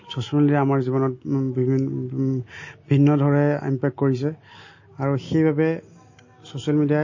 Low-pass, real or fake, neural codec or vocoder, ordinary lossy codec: 7.2 kHz; real; none; MP3, 32 kbps